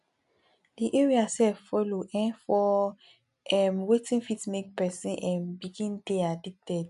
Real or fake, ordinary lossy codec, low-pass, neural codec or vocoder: real; none; 10.8 kHz; none